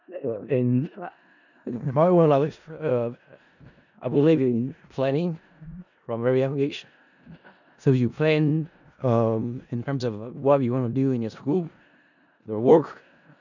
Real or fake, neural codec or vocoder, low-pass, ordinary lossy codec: fake; codec, 16 kHz in and 24 kHz out, 0.4 kbps, LongCat-Audio-Codec, four codebook decoder; 7.2 kHz; none